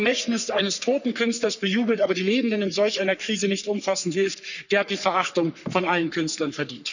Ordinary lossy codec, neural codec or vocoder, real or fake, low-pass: none; codec, 44.1 kHz, 3.4 kbps, Pupu-Codec; fake; 7.2 kHz